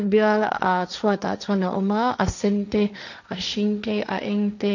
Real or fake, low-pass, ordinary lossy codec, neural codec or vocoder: fake; 7.2 kHz; none; codec, 16 kHz, 1.1 kbps, Voila-Tokenizer